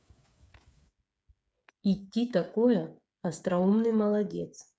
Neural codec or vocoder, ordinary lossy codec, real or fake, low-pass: codec, 16 kHz, 8 kbps, FreqCodec, smaller model; none; fake; none